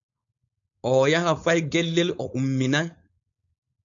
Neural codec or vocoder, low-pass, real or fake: codec, 16 kHz, 4.8 kbps, FACodec; 7.2 kHz; fake